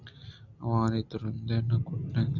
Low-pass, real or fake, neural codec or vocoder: 7.2 kHz; real; none